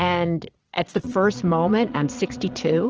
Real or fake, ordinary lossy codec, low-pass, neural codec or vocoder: fake; Opus, 16 kbps; 7.2 kHz; vocoder, 22.05 kHz, 80 mel bands, Vocos